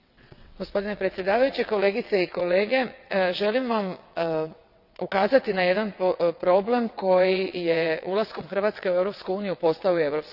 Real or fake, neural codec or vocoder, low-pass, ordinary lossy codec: fake; vocoder, 22.05 kHz, 80 mel bands, WaveNeXt; 5.4 kHz; none